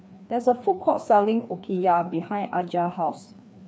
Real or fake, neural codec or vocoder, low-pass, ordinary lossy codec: fake; codec, 16 kHz, 2 kbps, FreqCodec, larger model; none; none